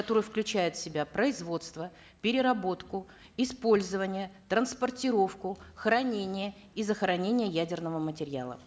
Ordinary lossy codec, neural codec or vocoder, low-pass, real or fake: none; none; none; real